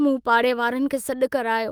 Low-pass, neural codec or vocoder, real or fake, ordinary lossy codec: 19.8 kHz; autoencoder, 48 kHz, 128 numbers a frame, DAC-VAE, trained on Japanese speech; fake; Opus, 24 kbps